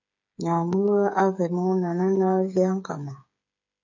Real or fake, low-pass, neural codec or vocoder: fake; 7.2 kHz; codec, 16 kHz, 8 kbps, FreqCodec, smaller model